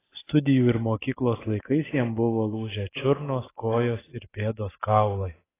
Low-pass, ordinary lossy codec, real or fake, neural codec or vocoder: 3.6 kHz; AAC, 16 kbps; real; none